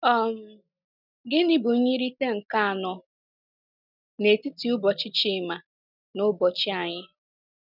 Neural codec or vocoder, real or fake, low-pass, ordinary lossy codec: none; real; 5.4 kHz; none